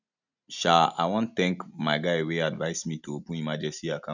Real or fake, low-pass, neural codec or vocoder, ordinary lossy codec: real; 7.2 kHz; none; none